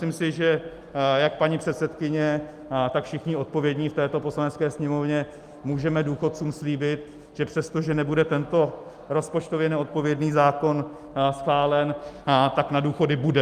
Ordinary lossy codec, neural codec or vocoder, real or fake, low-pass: Opus, 32 kbps; none; real; 14.4 kHz